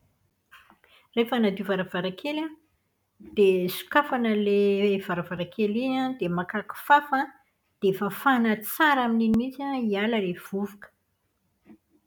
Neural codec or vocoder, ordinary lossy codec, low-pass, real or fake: none; none; none; real